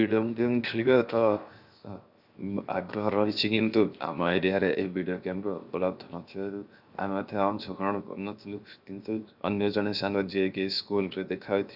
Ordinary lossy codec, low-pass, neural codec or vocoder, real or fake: Opus, 64 kbps; 5.4 kHz; codec, 16 kHz, 0.7 kbps, FocalCodec; fake